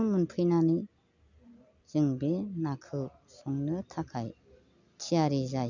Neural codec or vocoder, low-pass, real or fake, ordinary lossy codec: none; 7.2 kHz; real; none